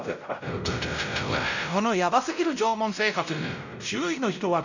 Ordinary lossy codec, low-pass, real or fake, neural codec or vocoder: none; 7.2 kHz; fake; codec, 16 kHz, 0.5 kbps, X-Codec, WavLM features, trained on Multilingual LibriSpeech